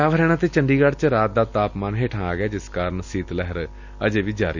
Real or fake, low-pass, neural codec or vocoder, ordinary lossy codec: real; 7.2 kHz; none; none